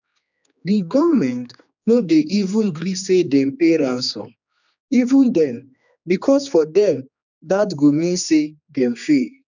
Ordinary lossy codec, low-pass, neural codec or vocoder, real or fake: none; 7.2 kHz; codec, 16 kHz, 2 kbps, X-Codec, HuBERT features, trained on general audio; fake